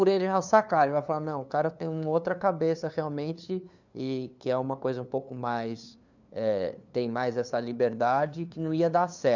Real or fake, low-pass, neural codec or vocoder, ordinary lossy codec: fake; 7.2 kHz; codec, 16 kHz, 2 kbps, FunCodec, trained on LibriTTS, 25 frames a second; none